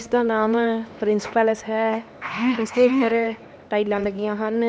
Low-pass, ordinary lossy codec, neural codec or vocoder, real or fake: none; none; codec, 16 kHz, 2 kbps, X-Codec, HuBERT features, trained on LibriSpeech; fake